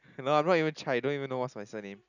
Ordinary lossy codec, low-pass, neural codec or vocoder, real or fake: none; 7.2 kHz; none; real